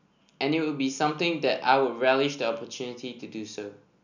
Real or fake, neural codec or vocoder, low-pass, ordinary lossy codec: real; none; 7.2 kHz; none